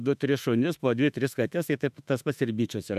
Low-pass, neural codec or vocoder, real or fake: 14.4 kHz; autoencoder, 48 kHz, 32 numbers a frame, DAC-VAE, trained on Japanese speech; fake